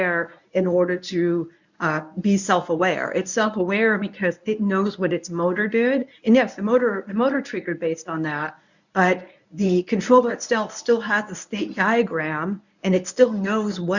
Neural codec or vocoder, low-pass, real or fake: codec, 24 kHz, 0.9 kbps, WavTokenizer, medium speech release version 1; 7.2 kHz; fake